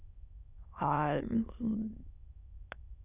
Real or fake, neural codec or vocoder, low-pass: fake; autoencoder, 22.05 kHz, a latent of 192 numbers a frame, VITS, trained on many speakers; 3.6 kHz